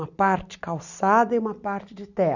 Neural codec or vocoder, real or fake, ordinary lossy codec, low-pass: none; real; none; 7.2 kHz